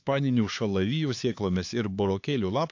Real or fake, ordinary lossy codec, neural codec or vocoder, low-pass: fake; AAC, 48 kbps; codec, 16 kHz, 4 kbps, X-Codec, HuBERT features, trained on LibriSpeech; 7.2 kHz